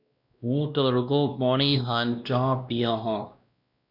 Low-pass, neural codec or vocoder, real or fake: 5.4 kHz; codec, 16 kHz, 1 kbps, X-Codec, WavLM features, trained on Multilingual LibriSpeech; fake